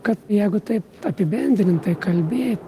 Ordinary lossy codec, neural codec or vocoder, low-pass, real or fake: Opus, 24 kbps; none; 14.4 kHz; real